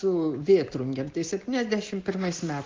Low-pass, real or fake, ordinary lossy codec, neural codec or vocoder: 7.2 kHz; real; Opus, 16 kbps; none